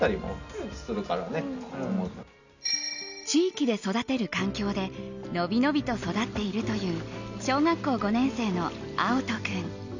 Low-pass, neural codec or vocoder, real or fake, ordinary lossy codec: 7.2 kHz; none; real; none